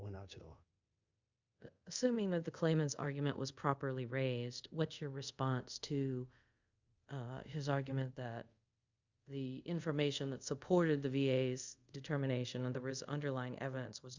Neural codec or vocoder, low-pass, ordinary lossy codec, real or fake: codec, 24 kHz, 0.5 kbps, DualCodec; 7.2 kHz; Opus, 64 kbps; fake